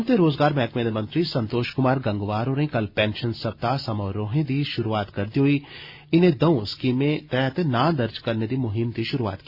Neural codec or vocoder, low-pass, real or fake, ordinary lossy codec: none; 5.4 kHz; real; Opus, 64 kbps